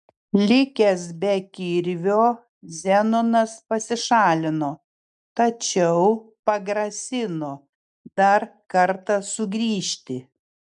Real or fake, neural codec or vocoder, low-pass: real; none; 10.8 kHz